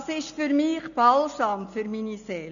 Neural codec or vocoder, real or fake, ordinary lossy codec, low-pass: none; real; none; 7.2 kHz